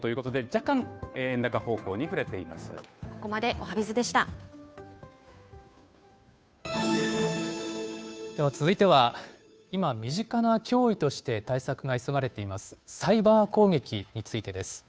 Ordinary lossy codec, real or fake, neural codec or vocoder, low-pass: none; fake; codec, 16 kHz, 2 kbps, FunCodec, trained on Chinese and English, 25 frames a second; none